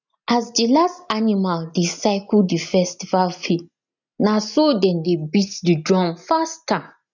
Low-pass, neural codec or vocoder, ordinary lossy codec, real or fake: 7.2 kHz; none; none; real